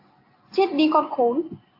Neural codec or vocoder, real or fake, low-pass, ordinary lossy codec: none; real; 5.4 kHz; MP3, 32 kbps